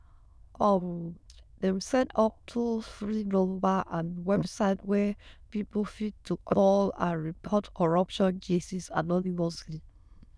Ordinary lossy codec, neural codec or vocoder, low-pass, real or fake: none; autoencoder, 22.05 kHz, a latent of 192 numbers a frame, VITS, trained on many speakers; none; fake